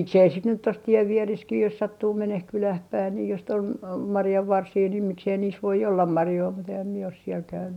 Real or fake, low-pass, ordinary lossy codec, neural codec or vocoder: real; 19.8 kHz; none; none